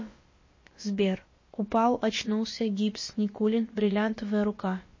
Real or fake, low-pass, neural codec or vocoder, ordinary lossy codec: fake; 7.2 kHz; codec, 16 kHz, about 1 kbps, DyCAST, with the encoder's durations; MP3, 32 kbps